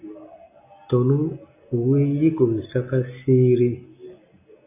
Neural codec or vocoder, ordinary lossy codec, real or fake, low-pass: none; AAC, 24 kbps; real; 3.6 kHz